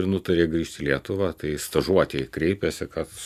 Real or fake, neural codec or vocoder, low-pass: real; none; 14.4 kHz